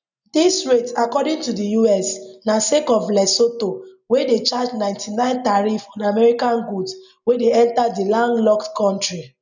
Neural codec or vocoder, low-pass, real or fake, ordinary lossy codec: none; 7.2 kHz; real; none